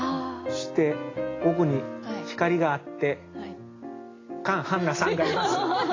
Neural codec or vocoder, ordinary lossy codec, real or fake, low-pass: none; AAC, 32 kbps; real; 7.2 kHz